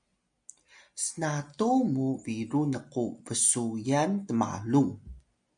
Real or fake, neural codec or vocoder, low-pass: real; none; 9.9 kHz